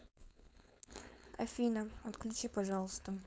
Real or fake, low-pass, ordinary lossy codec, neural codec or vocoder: fake; none; none; codec, 16 kHz, 4.8 kbps, FACodec